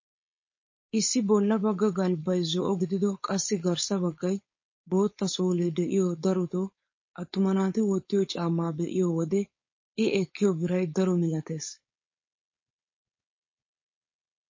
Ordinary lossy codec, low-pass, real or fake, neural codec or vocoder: MP3, 32 kbps; 7.2 kHz; fake; codec, 16 kHz, 4.8 kbps, FACodec